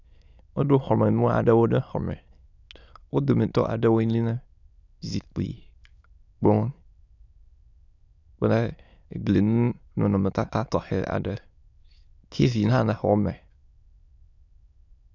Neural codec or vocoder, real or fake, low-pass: autoencoder, 22.05 kHz, a latent of 192 numbers a frame, VITS, trained on many speakers; fake; 7.2 kHz